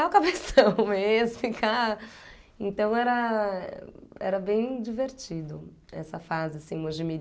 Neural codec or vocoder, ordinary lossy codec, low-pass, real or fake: none; none; none; real